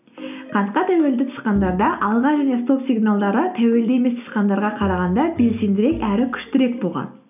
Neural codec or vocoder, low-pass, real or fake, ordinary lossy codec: none; 3.6 kHz; real; none